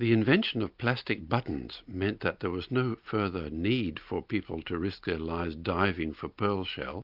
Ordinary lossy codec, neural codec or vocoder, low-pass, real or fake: Opus, 64 kbps; none; 5.4 kHz; real